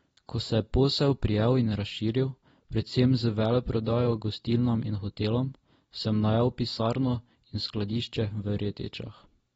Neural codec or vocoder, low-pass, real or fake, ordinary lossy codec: none; 19.8 kHz; real; AAC, 24 kbps